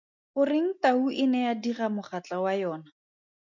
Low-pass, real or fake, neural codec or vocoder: 7.2 kHz; real; none